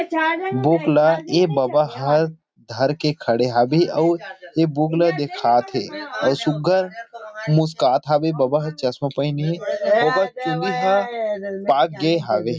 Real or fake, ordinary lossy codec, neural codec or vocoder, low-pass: real; none; none; none